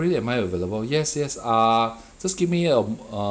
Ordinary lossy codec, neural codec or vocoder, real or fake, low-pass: none; none; real; none